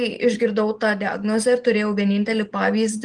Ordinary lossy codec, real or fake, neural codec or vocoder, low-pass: Opus, 24 kbps; real; none; 10.8 kHz